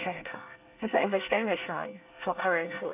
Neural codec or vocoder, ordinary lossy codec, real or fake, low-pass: codec, 24 kHz, 1 kbps, SNAC; AAC, 32 kbps; fake; 3.6 kHz